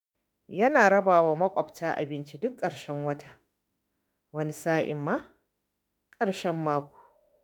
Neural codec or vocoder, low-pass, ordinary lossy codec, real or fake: autoencoder, 48 kHz, 32 numbers a frame, DAC-VAE, trained on Japanese speech; none; none; fake